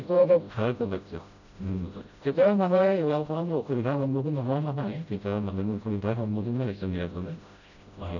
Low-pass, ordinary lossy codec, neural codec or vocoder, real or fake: 7.2 kHz; none; codec, 16 kHz, 0.5 kbps, FreqCodec, smaller model; fake